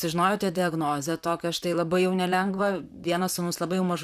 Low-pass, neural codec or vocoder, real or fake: 14.4 kHz; vocoder, 44.1 kHz, 128 mel bands, Pupu-Vocoder; fake